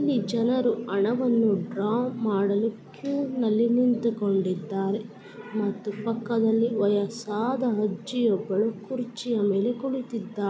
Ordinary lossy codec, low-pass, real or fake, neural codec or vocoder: none; none; real; none